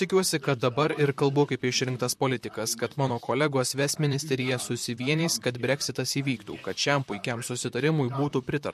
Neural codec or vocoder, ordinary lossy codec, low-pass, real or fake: vocoder, 44.1 kHz, 128 mel bands, Pupu-Vocoder; MP3, 64 kbps; 14.4 kHz; fake